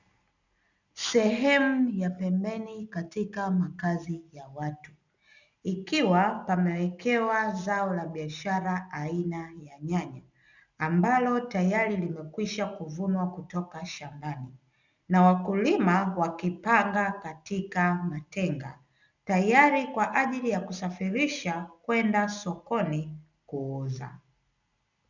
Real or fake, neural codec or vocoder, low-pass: real; none; 7.2 kHz